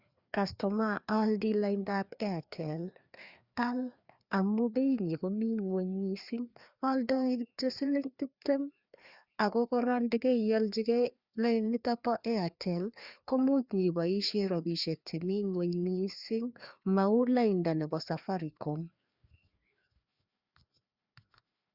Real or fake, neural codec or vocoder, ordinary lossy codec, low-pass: fake; codec, 16 kHz, 2 kbps, FreqCodec, larger model; Opus, 64 kbps; 5.4 kHz